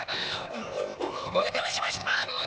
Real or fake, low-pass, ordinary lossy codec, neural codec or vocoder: fake; none; none; codec, 16 kHz, 0.8 kbps, ZipCodec